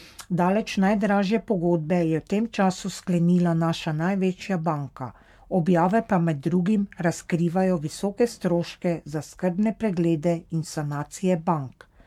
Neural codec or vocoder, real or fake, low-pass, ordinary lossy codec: codec, 44.1 kHz, 7.8 kbps, Pupu-Codec; fake; 19.8 kHz; MP3, 96 kbps